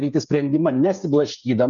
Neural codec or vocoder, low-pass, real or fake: codec, 16 kHz, 8 kbps, FreqCodec, smaller model; 7.2 kHz; fake